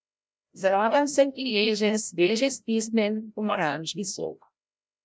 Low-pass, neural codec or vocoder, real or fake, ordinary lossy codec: none; codec, 16 kHz, 0.5 kbps, FreqCodec, larger model; fake; none